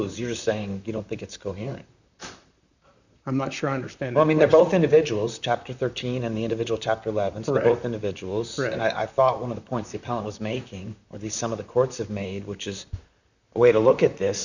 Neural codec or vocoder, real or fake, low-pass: vocoder, 44.1 kHz, 128 mel bands, Pupu-Vocoder; fake; 7.2 kHz